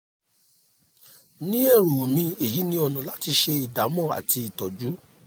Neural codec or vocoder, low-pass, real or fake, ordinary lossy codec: none; none; real; none